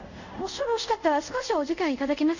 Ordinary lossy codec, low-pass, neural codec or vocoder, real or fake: none; 7.2 kHz; codec, 24 kHz, 0.5 kbps, DualCodec; fake